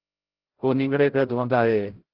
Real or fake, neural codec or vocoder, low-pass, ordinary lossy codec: fake; codec, 16 kHz, 0.5 kbps, FreqCodec, larger model; 5.4 kHz; Opus, 16 kbps